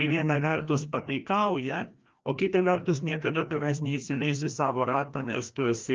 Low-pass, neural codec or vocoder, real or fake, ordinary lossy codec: 7.2 kHz; codec, 16 kHz, 1 kbps, FreqCodec, larger model; fake; Opus, 24 kbps